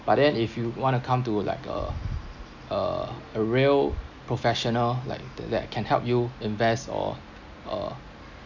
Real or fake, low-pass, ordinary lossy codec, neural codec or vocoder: real; 7.2 kHz; none; none